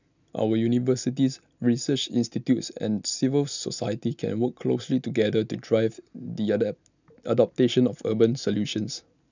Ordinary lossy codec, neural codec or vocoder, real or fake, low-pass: none; none; real; 7.2 kHz